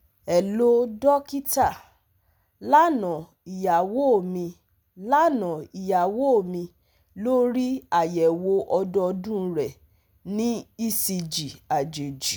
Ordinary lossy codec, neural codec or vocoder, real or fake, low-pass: none; none; real; none